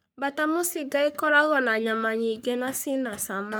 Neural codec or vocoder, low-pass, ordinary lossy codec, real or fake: codec, 44.1 kHz, 3.4 kbps, Pupu-Codec; none; none; fake